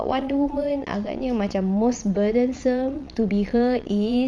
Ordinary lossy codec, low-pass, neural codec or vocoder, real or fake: none; none; vocoder, 22.05 kHz, 80 mel bands, Vocos; fake